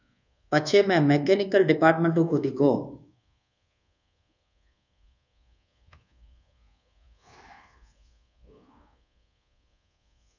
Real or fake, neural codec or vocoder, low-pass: fake; codec, 24 kHz, 1.2 kbps, DualCodec; 7.2 kHz